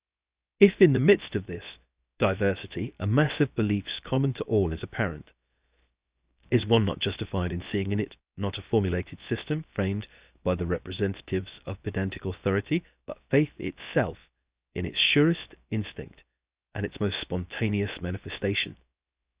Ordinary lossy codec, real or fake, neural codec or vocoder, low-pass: Opus, 64 kbps; fake; codec, 16 kHz, 0.7 kbps, FocalCodec; 3.6 kHz